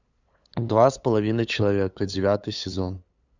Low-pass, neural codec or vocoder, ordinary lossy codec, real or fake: 7.2 kHz; codec, 16 kHz, 8 kbps, FunCodec, trained on LibriTTS, 25 frames a second; Opus, 64 kbps; fake